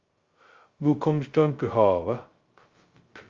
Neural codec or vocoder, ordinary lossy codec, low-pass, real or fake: codec, 16 kHz, 0.2 kbps, FocalCodec; Opus, 32 kbps; 7.2 kHz; fake